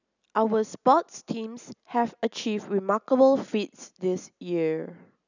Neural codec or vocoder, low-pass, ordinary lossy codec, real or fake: none; 7.2 kHz; none; real